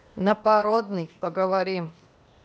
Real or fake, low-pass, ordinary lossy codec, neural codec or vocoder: fake; none; none; codec, 16 kHz, 0.8 kbps, ZipCodec